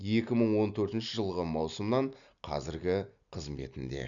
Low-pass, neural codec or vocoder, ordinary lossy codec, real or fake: 7.2 kHz; none; none; real